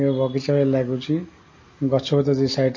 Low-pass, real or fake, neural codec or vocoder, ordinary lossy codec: 7.2 kHz; real; none; MP3, 32 kbps